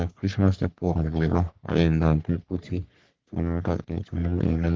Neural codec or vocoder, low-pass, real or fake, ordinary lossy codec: codec, 44.1 kHz, 3.4 kbps, Pupu-Codec; 7.2 kHz; fake; Opus, 16 kbps